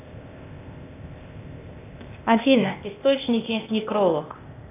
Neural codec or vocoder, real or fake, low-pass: codec, 16 kHz, 0.8 kbps, ZipCodec; fake; 3.6 kHz